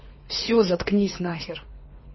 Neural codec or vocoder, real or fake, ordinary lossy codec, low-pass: codec, 24 kHz, 3 kbps, HILCodec; fake; MP3, 24 kbps; 7.2 kHz